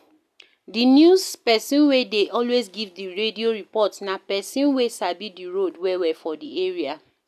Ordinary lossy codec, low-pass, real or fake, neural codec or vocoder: none; 14.4 kHz; real; none